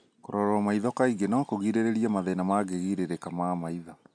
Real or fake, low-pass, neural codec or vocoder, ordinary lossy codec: real; 9.9 kHz; none; none